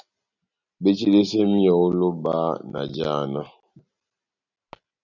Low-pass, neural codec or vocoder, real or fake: 7.2 kHz; none; real